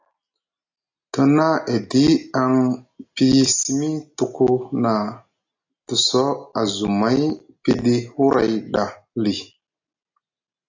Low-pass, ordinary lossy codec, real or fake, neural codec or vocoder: 7.2 kHz; AAC, 48 kbps; real; none